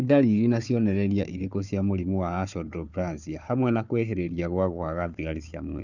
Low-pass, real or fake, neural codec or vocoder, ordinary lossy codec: 7.2 kHz; fake; codec, 16 kHz, 4 kbps, FunCodec, trained on Chinese and English, 50 frames a second; none